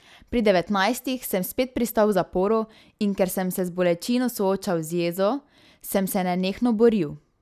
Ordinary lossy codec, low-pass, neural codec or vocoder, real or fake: none; 14.4 kHz; none; real